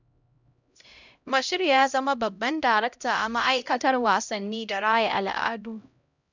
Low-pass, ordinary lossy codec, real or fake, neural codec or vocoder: 7.2 kHz; none; fake; codec, 16 kHz, 0.5 kbps, X-Codec, HuBERT features, trained on LibriSpeech